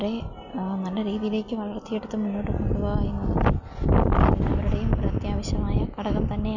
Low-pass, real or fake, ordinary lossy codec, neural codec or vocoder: 7.2 kHz; real; none; none